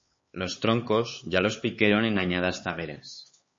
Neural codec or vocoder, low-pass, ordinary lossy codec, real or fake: codec, 16 kHz, 4 kbps, X-Codec, HuBERT features, trained on LibriSpeech; 7.2 kHz; MP3, 32 kbps; fake